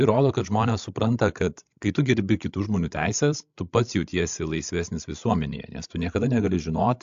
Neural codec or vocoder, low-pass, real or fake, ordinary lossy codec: codec, 16 kHz, 16 kbps, FreqCodec, larger model; 7.2 kHz; fake; AAC, 64 kbps